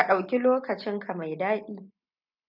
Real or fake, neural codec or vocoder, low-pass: real; none; 5.4 kHz